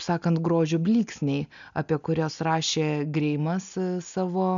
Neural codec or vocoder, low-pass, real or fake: none; 7.2 kHz; real